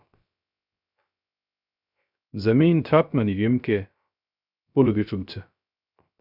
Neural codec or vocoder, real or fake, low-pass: codec, 16 kHz, 0.3 kbps, FocalCodec; fake; 5.4 kHz